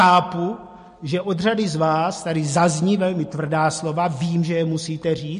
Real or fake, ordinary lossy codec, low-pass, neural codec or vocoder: real; MP3, 48 kbps; 10.8 kHz; none